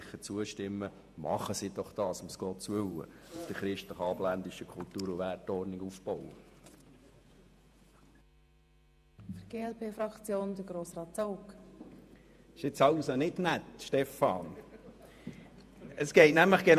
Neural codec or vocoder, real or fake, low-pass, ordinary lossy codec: vocoder, 48 kHz, 128 mel bands, Vocos; fake; 14.4 kHz; MP3, 96 kbps